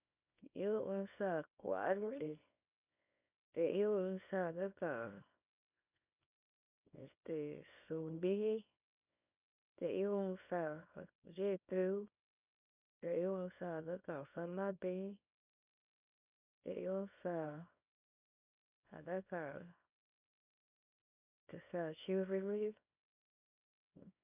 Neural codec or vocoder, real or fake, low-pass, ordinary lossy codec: codec, 24 kHz, 0.9 kbps, WavTokenizer, small release; fake; 3.6 kHz; none